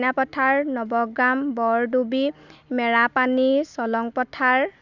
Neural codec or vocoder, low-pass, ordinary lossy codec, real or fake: none; 7.2 kHz; none; real